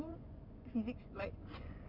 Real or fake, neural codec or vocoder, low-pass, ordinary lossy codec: fake; vocoder, 24 kHz, 100 mel bands, Vocos; 5.4 kHz; none